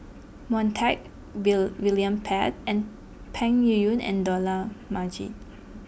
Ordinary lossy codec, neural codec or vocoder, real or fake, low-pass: none; none; real; none